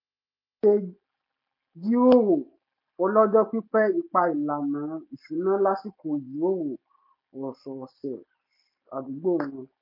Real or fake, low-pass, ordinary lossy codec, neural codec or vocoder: real; 5.4 kHz; MP3, 32 kbps; none